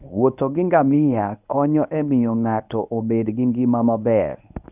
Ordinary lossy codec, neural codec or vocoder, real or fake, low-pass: none; codec, 24 kHz, 0.9 kbps, WavTokenizer, medium speech release version 1; fake; 3.6 kHz